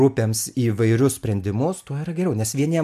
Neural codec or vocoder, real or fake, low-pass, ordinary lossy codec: none; real; 14.4 kHz; MP3, 96 kbps